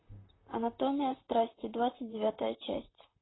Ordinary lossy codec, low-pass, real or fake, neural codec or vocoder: AAC, 16 kbps; 7.2 kHz; fake; vocoder, 44.1 kHz, 128 mel bands, Pupu-Vocoder